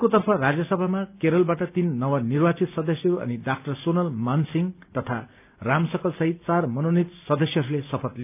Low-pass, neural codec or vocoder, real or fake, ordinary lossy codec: 3.6 kHz; none; real; none